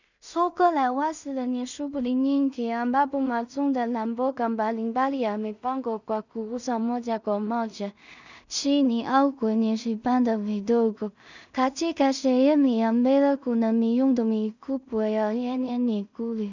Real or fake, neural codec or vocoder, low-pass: fake; codec, 16 kHz in and 24 kHz out, 0.4 kbps, LongCat-Audio-Codec, two codebook decoder; 7.2 kHz